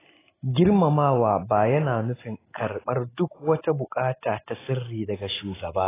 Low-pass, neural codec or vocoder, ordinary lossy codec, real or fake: 3.6 kHz; none; AAC, 16 kbps; real